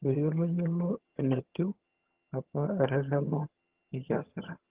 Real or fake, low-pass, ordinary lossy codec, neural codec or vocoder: fake; 3.6 kHz; Opus, 16 kbps; vocoder, 22.05 kHz, 80 mel bands, HiFi-GAN